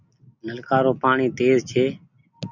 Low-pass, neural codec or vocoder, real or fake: 7.2 kHz; none; real